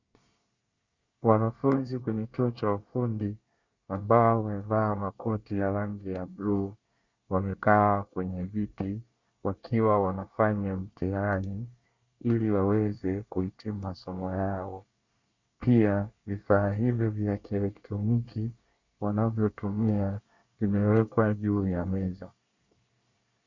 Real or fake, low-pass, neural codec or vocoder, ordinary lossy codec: fake; 7.2 kHz; codec, 24 kHz, 1 kbps, SNAC; Opus, 64 kbps